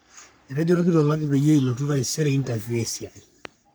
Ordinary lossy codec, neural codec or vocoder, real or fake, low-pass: none; codec, 44.1 kHz, 3.4 kbps, Pupu-Codec; fake; none